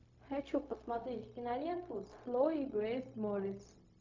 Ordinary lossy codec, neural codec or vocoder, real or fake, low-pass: MP3, 48 kbps; codec, 16 kHz, 0.4 kbps, LongCat-Audio-Codec; fake; 7.2 kHz